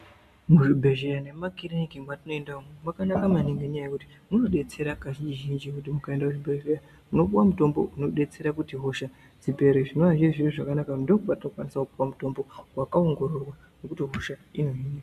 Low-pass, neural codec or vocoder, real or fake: 14.4 kHz; none; real